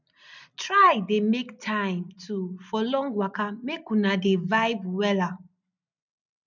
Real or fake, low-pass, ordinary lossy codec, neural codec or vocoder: real; 7.2 kHz; none; none